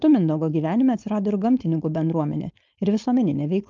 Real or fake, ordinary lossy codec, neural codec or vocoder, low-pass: fake; Opus, 24 kbps; codec, 16 kHz, 4.8 kbps, FACodec; 7.2 kHz